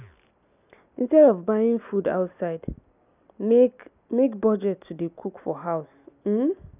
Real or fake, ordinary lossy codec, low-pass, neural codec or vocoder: real; none; 3.6 kHz; none